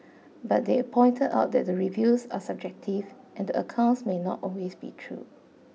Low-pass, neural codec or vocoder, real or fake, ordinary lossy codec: none; none; real; none